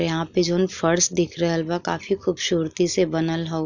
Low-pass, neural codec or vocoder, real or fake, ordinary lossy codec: 7.2 kHz; none; real; none